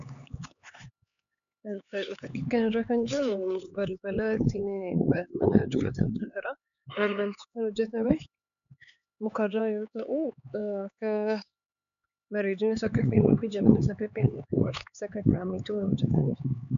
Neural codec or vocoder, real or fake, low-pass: codec, 16 kHz, 4 kbps, X-Codec, HuBERT features, trained on LibriSpeech; fake; 7.2 kHz